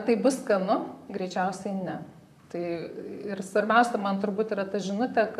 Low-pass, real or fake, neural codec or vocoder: 14.4 kHz; fake; vocoder, 44.1 kHz, 128 mel bands, Pupu-Vocoder